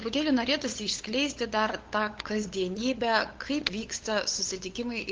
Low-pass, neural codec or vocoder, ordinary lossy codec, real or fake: 7.2 kHz; codec, 16 kHz, 8 kbps, FunCodec, trained on LibriTTS, 25 frames a second; Opus, 16 kbps; fake